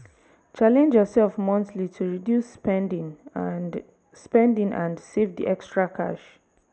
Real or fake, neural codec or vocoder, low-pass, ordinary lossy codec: real; none; none; none